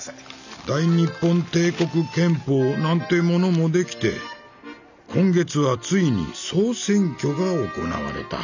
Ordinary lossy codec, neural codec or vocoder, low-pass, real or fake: none; none; 7.2 kHz; real